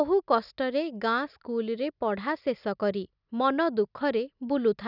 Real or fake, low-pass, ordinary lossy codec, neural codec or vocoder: real; 5.4 kHz; none; none